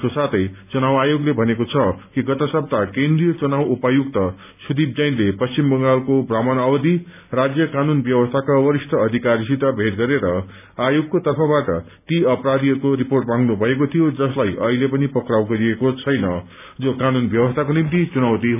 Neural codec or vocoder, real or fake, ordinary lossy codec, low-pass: none; real; none; 3.6 kHz